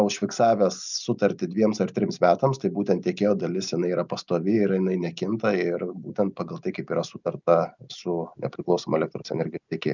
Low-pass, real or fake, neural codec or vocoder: 7.2 kHz; real; none